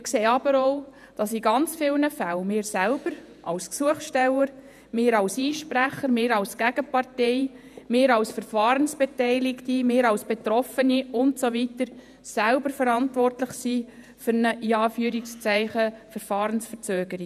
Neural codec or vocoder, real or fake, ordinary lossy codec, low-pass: vocoder, 48 kHz, 128 mel bands, Vocos; fake; none; 14.4 kHz